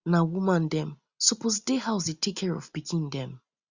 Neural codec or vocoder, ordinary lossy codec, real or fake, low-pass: none; Opus, 64 kbps; real; 7.2 kHz